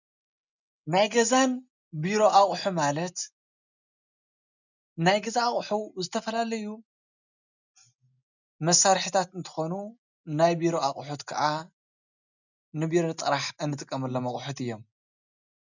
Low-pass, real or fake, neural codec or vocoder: 7.2 kHz; real; none